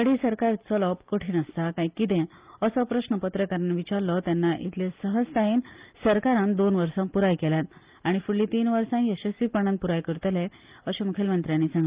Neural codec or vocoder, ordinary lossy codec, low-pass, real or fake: none; Opus, 32 kbps; 3.6 kHz; real